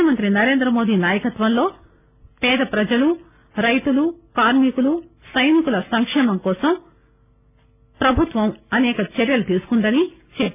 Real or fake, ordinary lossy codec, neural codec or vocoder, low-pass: real; none; none; 3.6 kHz